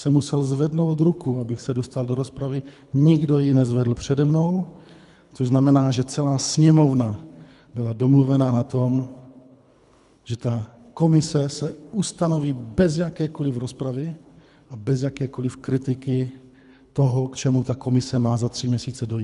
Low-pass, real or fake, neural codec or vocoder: 10.8 kHz; fake; codec, 24 kHz, 3 kbps, HILCodec